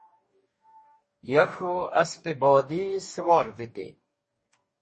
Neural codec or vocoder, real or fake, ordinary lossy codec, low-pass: codec, 44.1 kHz, 2.6 kbps, DAC; fake; MP3, 32 kbps; 10.8 kHz